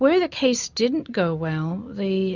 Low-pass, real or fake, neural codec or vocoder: 7.2 kHz; real; none